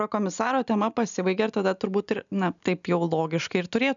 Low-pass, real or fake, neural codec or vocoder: 7.2 kHz; real; none